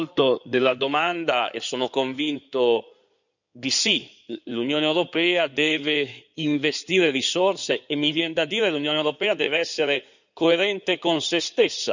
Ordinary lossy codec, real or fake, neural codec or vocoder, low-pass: none; fake; codec, 16 kHz in and 24 kHz out, 2.2 kbps, FireRedTTS-2 codec; 7.2 kHz